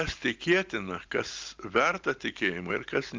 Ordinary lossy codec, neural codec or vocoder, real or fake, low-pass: Opus, 24 kbps; none; real; 7.2 kHz